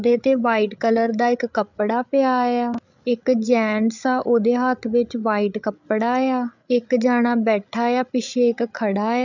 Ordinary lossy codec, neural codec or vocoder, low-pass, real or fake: none; codec, 16 kHz, 8 kbps, FreqCodec, larger model; 7.2 kHz; fake